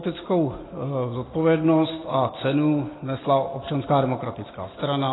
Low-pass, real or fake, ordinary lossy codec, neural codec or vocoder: 7.2 kHz; real; AAC, 16 kbps; none